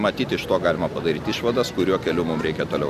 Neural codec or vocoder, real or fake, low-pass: none; real; 14.4 kHz